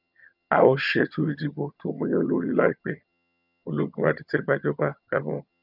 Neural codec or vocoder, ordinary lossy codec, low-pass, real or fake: vocoder, 22.05 kHz, 80 mel bands, HiFi-GAN; none; 5.4 kHz; fake